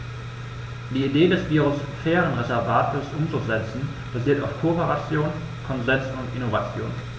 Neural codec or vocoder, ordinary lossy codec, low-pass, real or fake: none; none; none; real